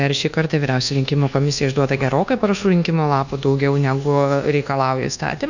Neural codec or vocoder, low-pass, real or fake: codec, 24 kHz, 1.2 kbps, DualCodec; 7.2 kHz; fake